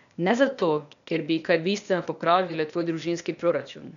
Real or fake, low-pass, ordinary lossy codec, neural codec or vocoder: fake; 7.2 kHz; none; codec, 16 kHz, 0.8 kbps, ZipCodec